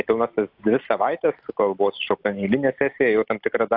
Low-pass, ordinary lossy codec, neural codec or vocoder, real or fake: 5.4 kHz; AAC, 48 kbps; none; real